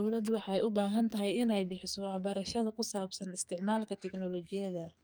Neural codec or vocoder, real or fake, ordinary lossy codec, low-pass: codec, 44.1 kHz, 2.6 kbps, SNAC; fake; none; none